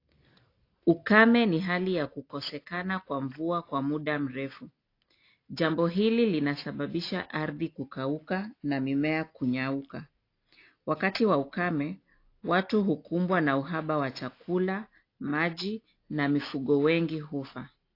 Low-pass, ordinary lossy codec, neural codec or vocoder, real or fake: 5.4 kHz; AAC, 32 kbps; none; real